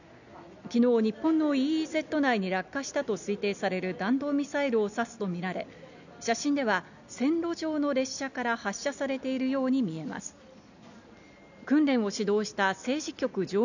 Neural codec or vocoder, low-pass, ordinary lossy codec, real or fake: none; 7.2 kHz; none; real